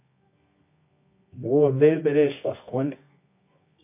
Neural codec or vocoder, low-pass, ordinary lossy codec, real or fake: codec, 24 kHz, 0.9 kbps, WavTokenizer, medium music audio release; 3.6 kHz; MP3, 24 kbps; fake